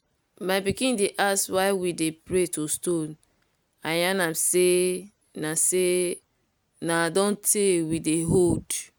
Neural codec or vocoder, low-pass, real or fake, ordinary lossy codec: none; none; real; none